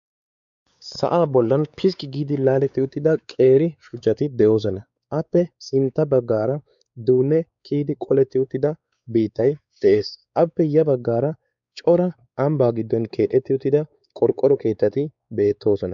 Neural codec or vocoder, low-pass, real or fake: codec, 16 kHz, 4 kbps, X-Codec, WavLM features, trained on Multilingual LibriSpeech; 7.2 kHz; fake